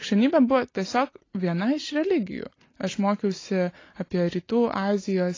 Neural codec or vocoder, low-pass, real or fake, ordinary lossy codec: none; 7.2 kHz; real; AAC, 32 kbps